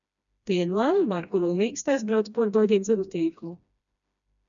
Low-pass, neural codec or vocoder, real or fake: 7.2 kHz; codec, 16 kHz, 1 kbps, FreqCodec, smaller model; fake